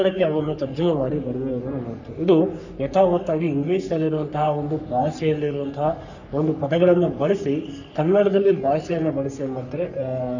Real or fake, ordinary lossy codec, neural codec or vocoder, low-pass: fake; none; codec, 44.1 kHz, 3.4 kbps, Pupu-Codec; 7.2 kHz